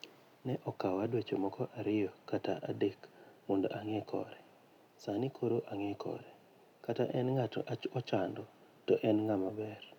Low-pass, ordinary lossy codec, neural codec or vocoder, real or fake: 19.8 kHz; none; none; real